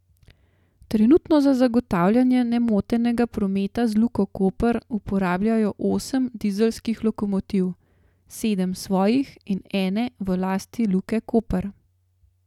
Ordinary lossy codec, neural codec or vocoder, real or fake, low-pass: none; none; real; 19.8 kHz